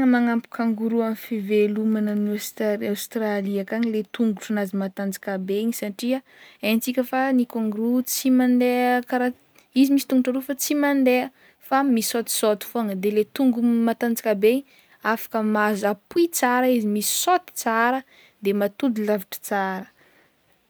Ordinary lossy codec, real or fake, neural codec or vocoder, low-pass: none; real; none; none